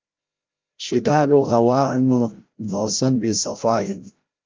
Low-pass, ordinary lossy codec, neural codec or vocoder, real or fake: 7.2 kHz; Opus, 32 kbps; codec, 16 kHz, 0.5 kbps, FreqCodec, larger model; fake